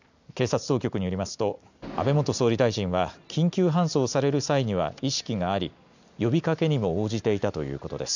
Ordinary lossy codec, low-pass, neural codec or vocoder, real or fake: none; 7.2 kHz; none; real